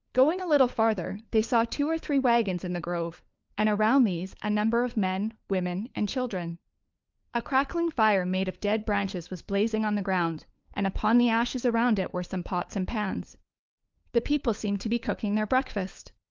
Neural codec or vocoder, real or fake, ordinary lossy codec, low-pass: codec, 16 kHz, 4 kbps, FunCodec, trained on LibriTTS, 50 frames a second; fake; Opus, 24 kbps; 7.2 kHz